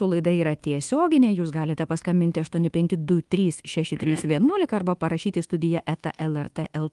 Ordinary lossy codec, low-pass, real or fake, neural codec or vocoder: Opus, 24 kbps; 10.8 kHz; fake; codec, 24 kHz, 1.2 kbps, DualCodec